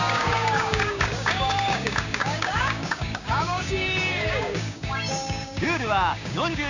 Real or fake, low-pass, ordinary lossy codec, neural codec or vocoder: fake; 7.2 kHz; none; codec, 16 kHz, 6 kbps, DAC